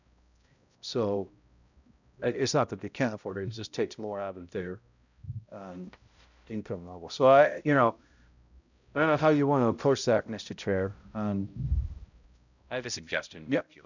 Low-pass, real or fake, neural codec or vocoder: 7.2 kHz; fake; codec, 16 kHz, 0.5 kbps, X-Codec, HuBERT features, trained on balanced general audio